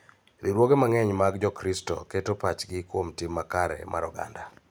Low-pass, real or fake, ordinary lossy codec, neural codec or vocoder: none; real; none; none